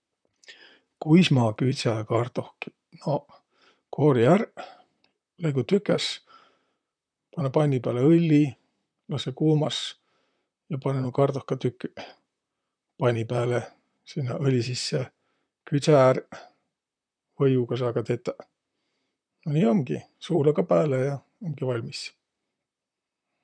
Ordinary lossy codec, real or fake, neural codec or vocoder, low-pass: none; fake; vocoder, 44.1 kHz, 128 mel bands, Pupu-Vocoder; 9.9 kHz